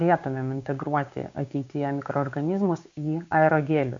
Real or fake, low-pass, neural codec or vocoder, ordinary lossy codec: fake; 7.2 kHz; codec, 16 kHz, 6 kbps, DAC; MP3, 64 kbps